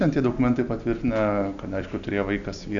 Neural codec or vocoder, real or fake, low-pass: none; real; 7.2 kHz